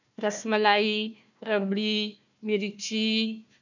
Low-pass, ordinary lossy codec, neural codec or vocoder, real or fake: 7.2 kHz; none; codec, 16 kHz, 1 kbps, FunCodec, trained on Chinese and English, 50 frames a second; fake